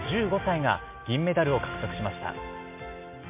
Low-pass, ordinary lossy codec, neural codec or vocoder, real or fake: 3.6 kHz; MP3, 24 kbps; none; real